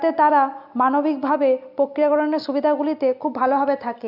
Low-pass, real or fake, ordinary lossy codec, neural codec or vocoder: 5.4 kHz; real; none; none